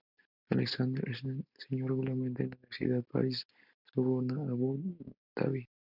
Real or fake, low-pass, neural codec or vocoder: real; 5.4 kHz; none